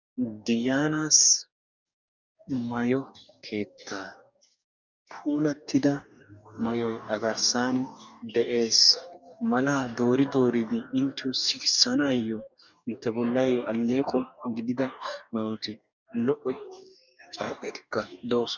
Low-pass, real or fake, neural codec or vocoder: 7.2 kHz; fake; codec, 44.1 kHz, 2.6 kbps, DAC